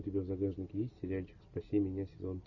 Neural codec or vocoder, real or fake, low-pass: none; real; 7.2 kHz